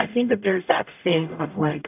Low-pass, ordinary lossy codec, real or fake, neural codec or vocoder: 3.6 kHz; AAC, 32 kbps; fake; codec, 44.1 kHz, 0.9 kbps, DAC